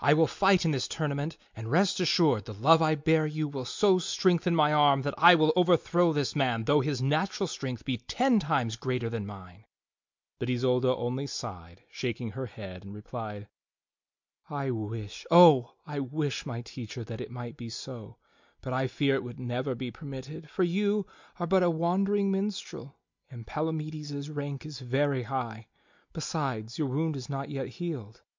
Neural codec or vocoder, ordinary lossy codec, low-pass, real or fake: none; MP3, 64 kbps; 7.2 kHz; real